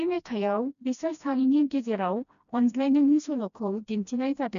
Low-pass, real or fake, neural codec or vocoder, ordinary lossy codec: 7.2 kHz; fake; codec, 16 kHz, 1 kbps, FreqCodec, smaller model; none